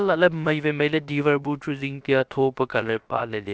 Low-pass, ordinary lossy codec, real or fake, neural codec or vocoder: none; none; fake; codec, 16 kHz, about 1 kbps, DyCAST, with the encoder's durations